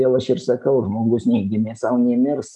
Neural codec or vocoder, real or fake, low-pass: none; real; 10.8 kHz